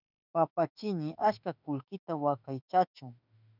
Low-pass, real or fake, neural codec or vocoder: 5.4 kHz; fake; autoencoder, 48 kHz, 32 numbers a frame, DAC-VAE, trained on Japanese speech